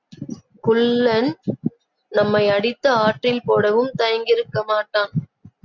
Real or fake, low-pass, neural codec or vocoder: real; 7.2 kHz; none